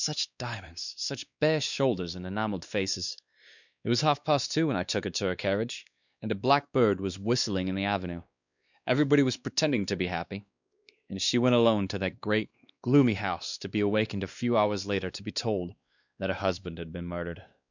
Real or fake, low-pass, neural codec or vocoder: fake; 7.2 kHz; codec, 16 kHz, 2 kbps, X-Codec, WavLM features, trained on Multilingual LibriSpeech